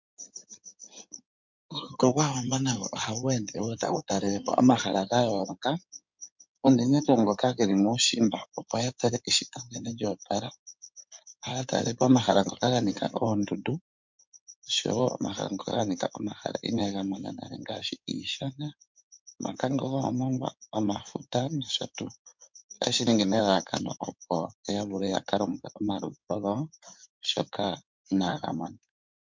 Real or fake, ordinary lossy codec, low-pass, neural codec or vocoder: fake; MP3, 64 kbps; 7.2 kHz; codec, 16 kHz in and 24 kHz out, 2.2 kbps, FireRedTTS-2 codec